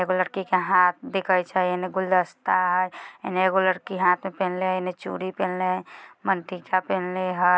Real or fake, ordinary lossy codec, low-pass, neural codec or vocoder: real; none; none; none